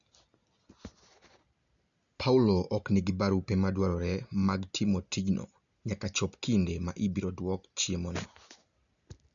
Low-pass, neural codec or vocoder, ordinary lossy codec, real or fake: 7.2 kHz; none; none; real